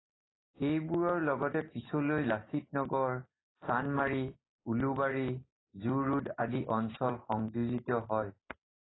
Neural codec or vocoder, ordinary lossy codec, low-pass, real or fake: none; AAC, 16 kbps; 7.2 kHz; real